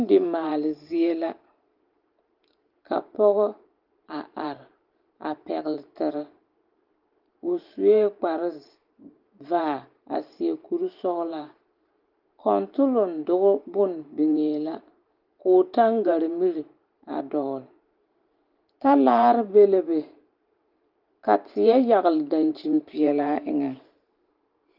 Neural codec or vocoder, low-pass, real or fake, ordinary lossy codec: vocoder, 44.1 kHz, 128 mel bands every 512 samples, BigVGAN v2; 5.4 kHz; fake; Opus, 32 kbps